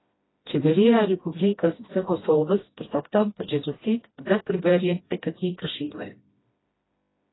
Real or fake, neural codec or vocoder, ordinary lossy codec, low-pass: fake; codec, 16 kHz, 1 kbps, FreqCodec, smaller model; AAC, 16 kbps; 7.2 kHz